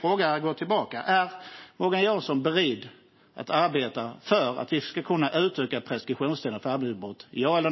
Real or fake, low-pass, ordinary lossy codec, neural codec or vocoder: real; 7.2 kHz; MP3, 24 kbps; none